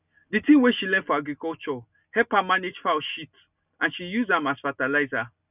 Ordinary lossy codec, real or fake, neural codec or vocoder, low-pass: none; real; none; 3.6 kHz